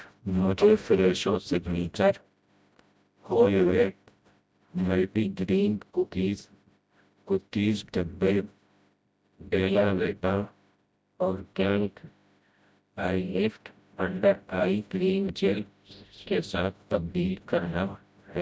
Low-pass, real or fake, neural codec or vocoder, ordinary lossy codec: none; fake; codec, 16 kHz, 0.5 kbps, FreqCodec, smaller model; none